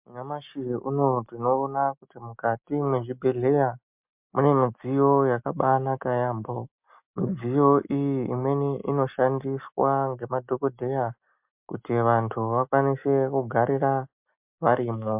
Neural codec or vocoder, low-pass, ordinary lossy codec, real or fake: none; 3.6 kHz; MP3, 32 kbps; real